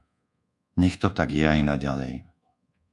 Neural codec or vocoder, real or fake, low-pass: codec, 24 kHz, 1.2 kbps, DualCodec; fake; 10.8 kHz